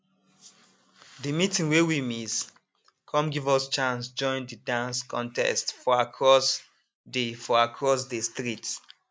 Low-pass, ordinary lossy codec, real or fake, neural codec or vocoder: none; none; real; none